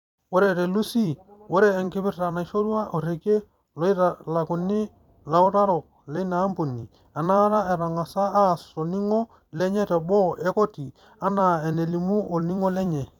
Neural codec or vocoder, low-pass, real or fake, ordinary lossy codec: vocoder, 44.1 kHz, 128 mel bands every 256 samples, BigVGAN v2; 19.8 kHz; fake; none